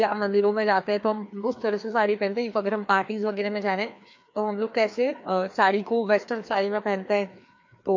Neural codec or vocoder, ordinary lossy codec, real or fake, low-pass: codec, 16 kHz, 2 kbps, FreqCodec, larger model; MP3, 48 kbps; fake; 7.2 kHz